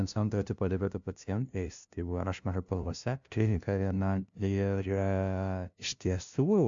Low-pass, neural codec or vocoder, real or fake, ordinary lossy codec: 7.2 kHz; codec, 16 kHz, 0.5 kbps, FunCodec, trained on LibriTTS, 25 frames a second; fake; MP3, 64 kbps